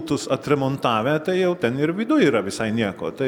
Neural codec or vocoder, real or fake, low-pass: none; real; 19.8 kHz